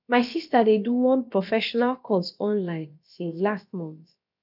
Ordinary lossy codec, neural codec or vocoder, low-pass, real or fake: none; codec, 16 kHz, about 1 kbps, DyCAST, with the encoder's durations; 5.4 kHz; fake